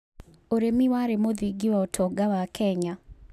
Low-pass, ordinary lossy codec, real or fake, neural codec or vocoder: 14.4 kHz; none; real; none